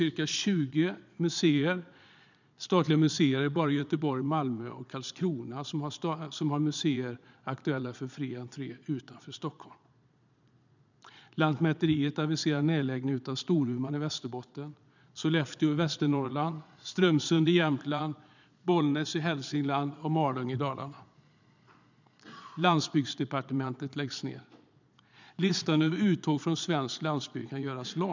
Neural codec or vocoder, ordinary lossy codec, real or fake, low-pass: vocoder, 22.05 kHz, 80 mel bands, Vocos; none; fake; 7.2 kHz